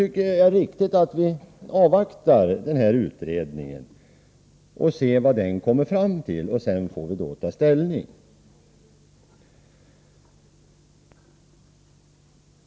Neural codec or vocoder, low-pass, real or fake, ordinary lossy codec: none; none; real; none